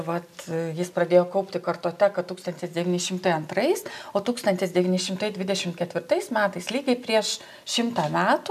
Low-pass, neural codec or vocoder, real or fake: 14.4 kHz; none; real